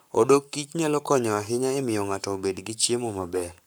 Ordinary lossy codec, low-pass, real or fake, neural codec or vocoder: none; none; fake; codec, 44.1 kHz, 7.8 kbps, Pupu-Codec